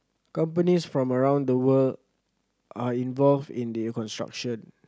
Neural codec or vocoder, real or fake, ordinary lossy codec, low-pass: none; real; none; none